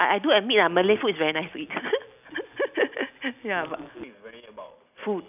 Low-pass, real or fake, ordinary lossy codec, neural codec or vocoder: 3.6 kHz; real; none; none